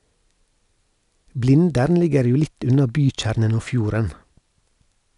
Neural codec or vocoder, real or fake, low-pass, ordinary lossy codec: none; real; 10.8 kHz; none